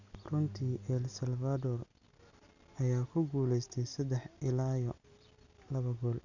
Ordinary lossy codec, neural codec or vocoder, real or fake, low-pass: none; none; real; 7.2 kHz